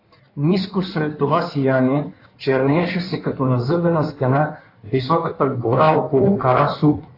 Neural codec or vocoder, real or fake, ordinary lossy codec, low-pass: codec, 16 kHz in and 24 kHz out, 1.1 kbps, FireRedTTS-2 codec; fake; AAC, 32 kbps; 5.4 kHz